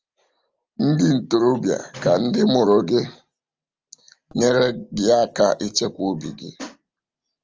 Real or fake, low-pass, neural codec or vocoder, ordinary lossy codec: real; 7.2 kHz; none; Opus, 24 kbps